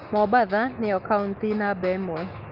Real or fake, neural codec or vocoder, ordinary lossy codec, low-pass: fake; autoencoder, 48 kHz, 128 numbers a frame, DAC-VAE, trained on Japanese speech; Opus, 32 kbps; 5.4 kHz